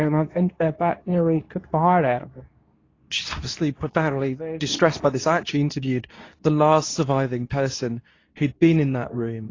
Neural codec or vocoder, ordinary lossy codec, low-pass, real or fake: codec, 24 kHz, 0.9 kbps, WavTokenizer, medium speech release version 2; AAC, 32 kbps; 7.2 kHz; fake